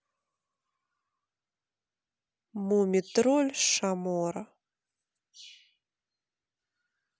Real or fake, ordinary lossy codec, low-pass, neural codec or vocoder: real; none; none; none